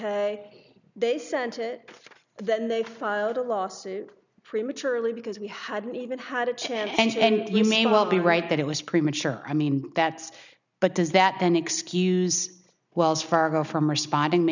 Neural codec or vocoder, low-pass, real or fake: none; 7.2 kHz; real